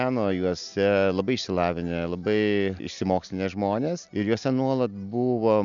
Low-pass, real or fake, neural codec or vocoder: 7.2 kHz; real; none